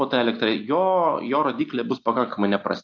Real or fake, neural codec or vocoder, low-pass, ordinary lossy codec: real; none; 7.2 kHz; AAC, 48 kbps